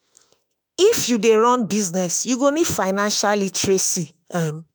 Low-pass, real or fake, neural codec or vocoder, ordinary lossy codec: none; fake; autoencoder, 48 kHz, 32 numbers a frame, DAC-VAE, trained on Japanese speech; none